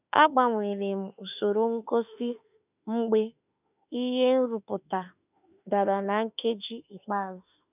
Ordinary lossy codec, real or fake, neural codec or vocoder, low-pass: none; fake; autoencoder, 48 kHz, 32 numbers a frame, DAC-VAE, trained on Japanese speech; 3.6 kHz